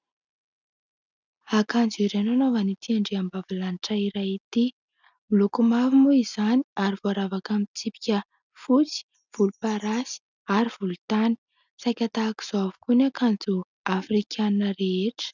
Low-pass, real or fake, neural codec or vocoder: 7.2 kHz; real; none